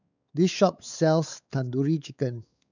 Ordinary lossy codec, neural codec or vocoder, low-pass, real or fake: none; codec, 16 kHz, 4 kbps, X-Codec, WavLM features, trained on Multilingual LibriSpeech; 7.2 kHz; fake